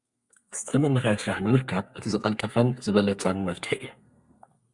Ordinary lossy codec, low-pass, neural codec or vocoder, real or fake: Opus, 64 kbps; 10.8 kHz; codec, 32 kHz, 1.9 kbps, SNAC; fake